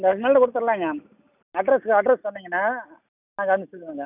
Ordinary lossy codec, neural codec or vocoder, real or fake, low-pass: none; none; real; 3.6 kHz